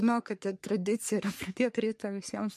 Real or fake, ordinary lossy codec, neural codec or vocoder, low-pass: fake; MP3, 64 kbps; codec, 44.1 kHz, 3.4 kbps, Pupu-Codec; 14.4 kHz